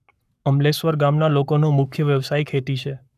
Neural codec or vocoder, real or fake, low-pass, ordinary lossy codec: codec, 44.1 kHz, 7.8 kbps, Pupu-Codec; fake; 14.4 kHz; none